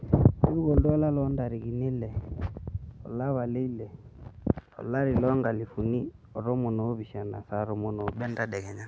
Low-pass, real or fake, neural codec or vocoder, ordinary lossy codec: none; real; none; none